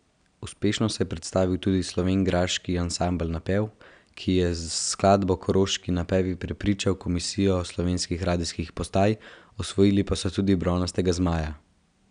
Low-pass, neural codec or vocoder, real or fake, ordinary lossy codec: 9.9 kHz; none; real; none